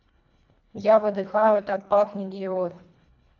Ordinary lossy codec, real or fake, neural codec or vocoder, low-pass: none; fake; codec, 24 kHz, 1.5 kbps, HILCodec; 7.2 kHz